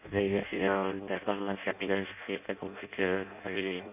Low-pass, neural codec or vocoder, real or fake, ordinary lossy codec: 3.6 kHz; codec, 16 kHz in and 24 kHz out, 0.6 kbps, FireRedTTS-2 codec; fake; none